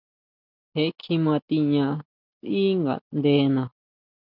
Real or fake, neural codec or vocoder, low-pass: real; none; 5.4 kHz